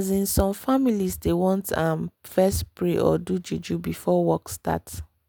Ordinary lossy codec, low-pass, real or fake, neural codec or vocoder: none; none; real; none